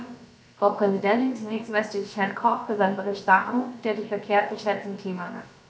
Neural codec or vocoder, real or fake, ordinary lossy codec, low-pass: codec, 16 kHz, about 1 kbps, DyCAST, with the encoder's durations; fake; none; none